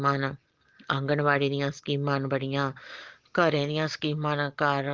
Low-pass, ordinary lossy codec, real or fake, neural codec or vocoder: 7.2 kHz; Opus, 16 kbps; real; none